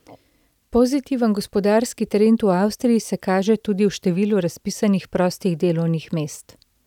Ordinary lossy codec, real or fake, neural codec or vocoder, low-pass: none; real; none; 19.8 kHz